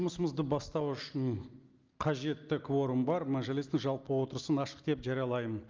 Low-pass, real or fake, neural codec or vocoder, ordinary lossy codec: 7.2 kHz; real; none; Opus, 32 kbps